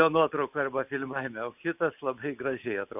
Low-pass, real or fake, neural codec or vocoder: 3.6 kHz; real; none